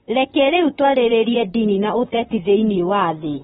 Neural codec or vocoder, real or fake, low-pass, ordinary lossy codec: vocoder, 44.1 kHz, 128 mel bands, Pupu-Vocoder; fake; 19.8 kHz; AAC, 16 kbps